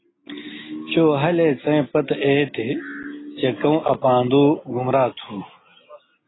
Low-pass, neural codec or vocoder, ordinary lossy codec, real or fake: 7.2 kHz; none; AAC, 16 kbps; real